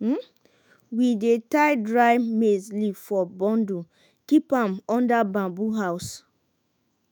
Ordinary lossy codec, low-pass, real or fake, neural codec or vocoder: none; none; fake; autoencoder, 48 kHz, 128 numbers a frame, DAC-VAE, trained on Japanese speech